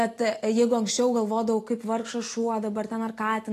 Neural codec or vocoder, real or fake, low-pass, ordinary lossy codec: none; real; 14.4 kHz; AAC, 48 kbps